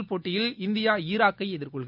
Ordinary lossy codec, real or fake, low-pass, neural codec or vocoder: none; real; 5.4 kHz; none